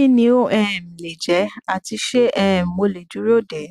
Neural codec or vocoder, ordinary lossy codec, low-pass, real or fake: none; Opus, 64 kbps; 14.4 kHz; real